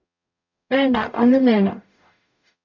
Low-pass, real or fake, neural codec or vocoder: 7.2 kHz; fake; codec, 44.1 kHz, 0.9 kbps, DAC